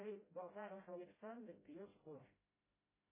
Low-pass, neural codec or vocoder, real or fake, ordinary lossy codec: 3.6 kHz; codec, 16 kHz, 0.5 kbps, FreqCodec, smaller model; fake; MP3, 16 kbps